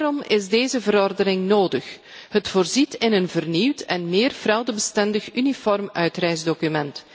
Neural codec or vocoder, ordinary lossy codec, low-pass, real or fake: none; none; none; real